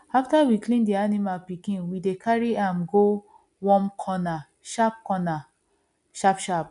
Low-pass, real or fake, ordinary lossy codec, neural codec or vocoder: 10.8 kHz; real; none; none